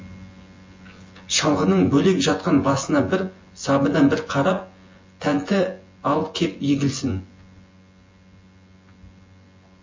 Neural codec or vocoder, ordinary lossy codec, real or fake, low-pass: vocoder, 24 kHz, 100 mel bands, Vocos; MP3, 32 kbps; fake; 7.2 kHz